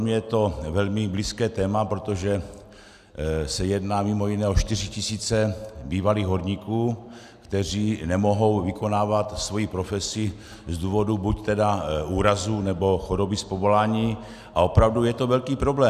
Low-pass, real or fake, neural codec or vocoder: 14.4 kHz; real; none